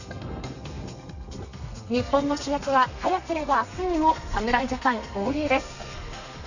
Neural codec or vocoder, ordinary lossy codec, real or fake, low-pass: codec, 32 kHz, 1.9 kbps, SNAC; none; fake; 7.2 kHz